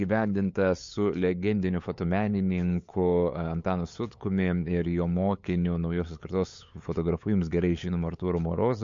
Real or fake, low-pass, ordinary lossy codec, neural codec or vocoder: fake; 7.2 kHz; MP3, 48 kbps; codec, 16 kHz, 8 kbps, FunCodec, trained on LibriTTS, 25 frames a second